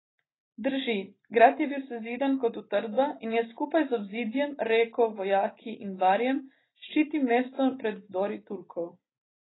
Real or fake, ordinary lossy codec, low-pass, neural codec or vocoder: real; AAC, 16 kbps; 7.2 kHz; none